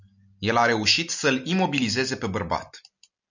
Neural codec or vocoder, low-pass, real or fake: none; 7.2 kHz; real